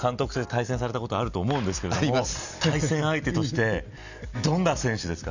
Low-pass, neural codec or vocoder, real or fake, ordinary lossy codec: 7.2 kHz; none; real; none